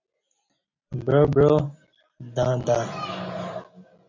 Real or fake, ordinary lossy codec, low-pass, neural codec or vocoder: real; MP3, 48 kbps; 7.2 kHz; none